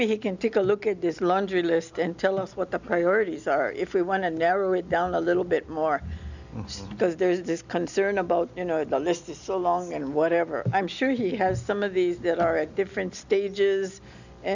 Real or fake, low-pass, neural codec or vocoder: real; 7.2 kHz; none